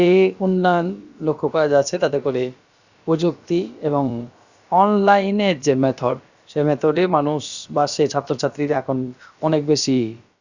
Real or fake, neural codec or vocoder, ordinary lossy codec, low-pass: fake; codec, 16 kHz, about 1 kbps, DyCAST, with the encoder's durations; Opus, 64 kbps; 7.2 kHz